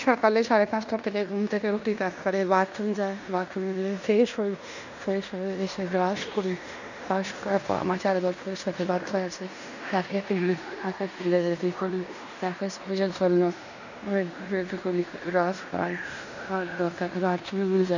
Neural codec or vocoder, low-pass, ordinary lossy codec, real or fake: codec, 16 kHz in and 24 kHz out, 0.9 kbps, LongCat-Audio-Codec, fine tuned four codebook decoder; 7.2 kHz; none; fake